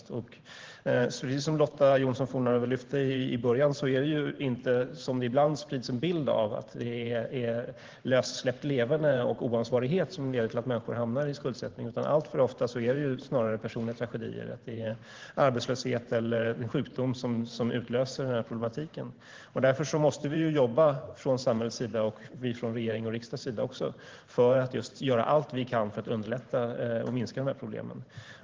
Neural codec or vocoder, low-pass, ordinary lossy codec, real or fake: vocoder, 44.1 kHz, 128 mel bands every 512 samples, BigVGAN v2; 7.2 kHz; Opus, 16 kbps; fake